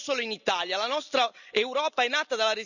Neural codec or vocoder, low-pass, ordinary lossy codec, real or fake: none; 7.2 kHz; none; real